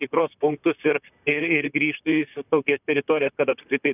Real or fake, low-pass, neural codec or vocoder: fake; 3.6 kHz; vocoder, 44.1 kHz, 128 mel bands, Pupu-Vocoder